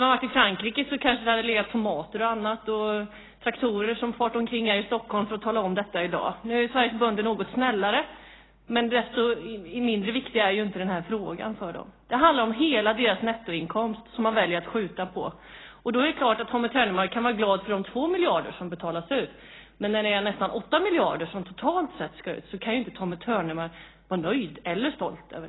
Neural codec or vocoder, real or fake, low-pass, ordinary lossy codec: none; real; 7.2 kHz; AAC, 16 kbps